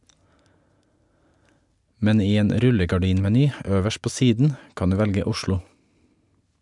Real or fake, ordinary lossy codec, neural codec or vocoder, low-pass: real; MP3, 96 kbps; none; 10.8 kHz